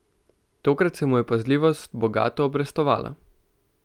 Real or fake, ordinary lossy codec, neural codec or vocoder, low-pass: real; Opus, 32 kbps; none; 19.8 kHz